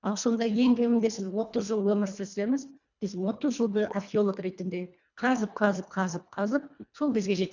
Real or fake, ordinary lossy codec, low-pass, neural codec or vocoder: fake; none; 7.2 kHz; codec, 24 kHz, 1.5 kbps, HILCodec